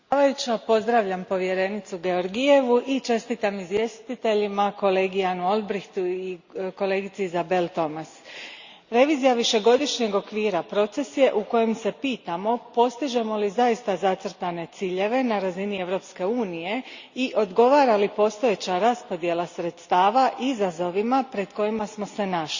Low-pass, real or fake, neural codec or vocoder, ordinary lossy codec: 7.2 kHz; real; none; Opus, 64 kbps